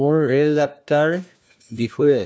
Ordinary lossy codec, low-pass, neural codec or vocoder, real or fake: none; none; codec, 16 kHz, 1 kbps, FunCodec, trained on LibriTTS, 50 frames a second; fake